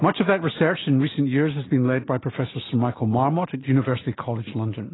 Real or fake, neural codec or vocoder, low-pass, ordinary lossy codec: real; none; 7.2 kHz; AAC, 16 kbps